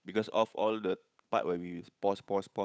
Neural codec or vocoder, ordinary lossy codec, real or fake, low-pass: codec, 16 kHz, 8 kbps, FunCodec, trained on Chinese and English, 25 frames a second; none; fake; none